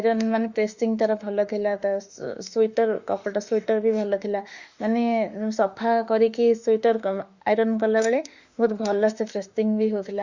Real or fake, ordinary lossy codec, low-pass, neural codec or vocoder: fake; Opus, 64 kbps; 7.2 kHz; autoencoder, 48 kHz, 32 numbers a frame, DAC-VAE, trained on Japanese speech